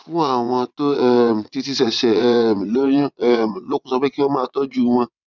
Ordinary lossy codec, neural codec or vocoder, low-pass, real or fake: none; vocoder, 22.05 kHz, 80 mel bands, WaveNeXt; 7.2 kHz; fake